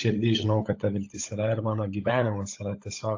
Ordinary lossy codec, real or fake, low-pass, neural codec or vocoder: AAC, 48 kbps; fake; 7.2 kHz; codec, 16 kHz, 16 kbps, FunCodec, trained on LibriTTS, 50 frames a second